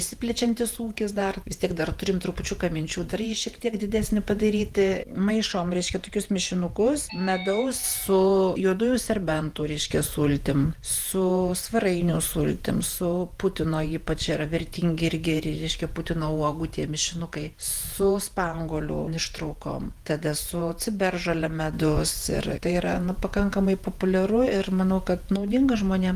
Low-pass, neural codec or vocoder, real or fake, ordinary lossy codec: 14.4 kHz; vocoder, 48 kHz, 128 mel bands, Vocos; fake; Opus, 24 kbps